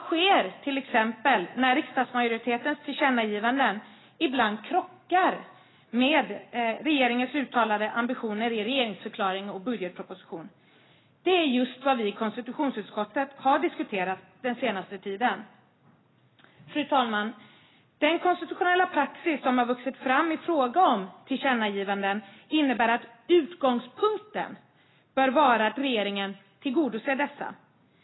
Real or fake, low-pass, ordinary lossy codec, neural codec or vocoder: real; 7.2 kHz; AAC, 16 kbps; none